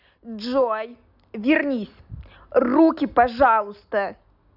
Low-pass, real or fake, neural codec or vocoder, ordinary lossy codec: 5.4 kHz; real; none; none